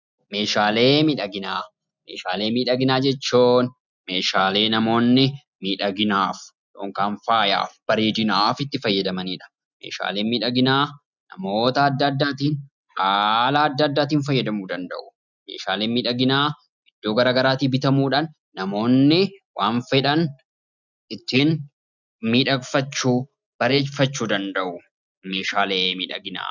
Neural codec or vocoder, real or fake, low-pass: none; real; 7.2 kHz